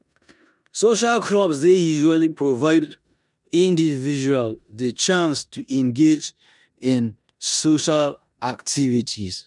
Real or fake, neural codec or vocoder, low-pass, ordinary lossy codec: fake; codec, 16 kHz in and 24 kHz out, 0.9 kbps, LongCat-Audio-Codec, four codebook decoder; 10.8 kHz; none